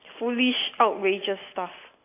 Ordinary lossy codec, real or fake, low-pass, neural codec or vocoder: AAC, 24 kbps; real; 3.6 kHz; none